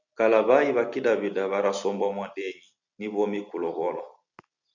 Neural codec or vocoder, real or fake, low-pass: none; real; 7.2 kHz